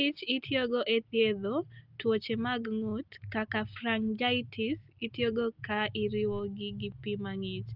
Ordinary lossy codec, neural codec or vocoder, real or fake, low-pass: Opus, 24 kbps; none; real; 5.4 kHz